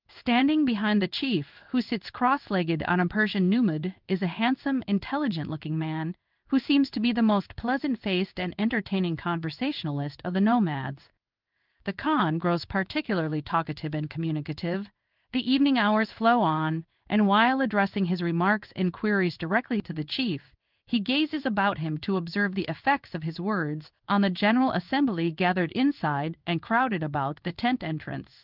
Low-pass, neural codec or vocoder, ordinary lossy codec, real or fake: 5.4 kHz; codec, 16 kHz in and 24 kHz out, 1 kbps, XY-Tokenizer; Opus, 24 kbps; fake